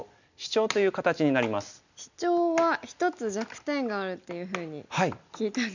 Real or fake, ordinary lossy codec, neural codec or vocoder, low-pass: real; none; none; 7.2 kHz